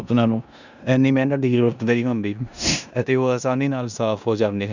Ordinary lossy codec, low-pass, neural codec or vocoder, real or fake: none; 7.2 kHz; codec, 16 kHz in and 24 kHz out, 0.9 kbps, LongCat-Audio-Codec, four codebook decoder; fake